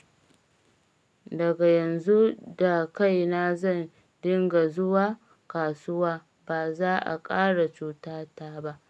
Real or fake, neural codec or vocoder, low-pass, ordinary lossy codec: real; none; none; none